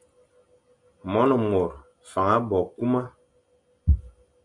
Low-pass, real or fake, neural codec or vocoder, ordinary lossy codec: 10.8 kHz; real; none; MP3, 64 kbps